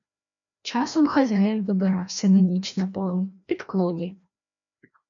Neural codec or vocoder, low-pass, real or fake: codec, 16 kHz, 1 kbps, FreqCodec, larger model; 7.2 kHz; fake